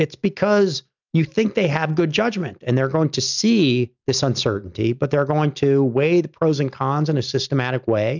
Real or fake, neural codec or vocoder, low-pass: real; none; 7.2 kHz